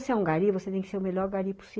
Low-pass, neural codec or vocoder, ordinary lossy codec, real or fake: none; none; none; real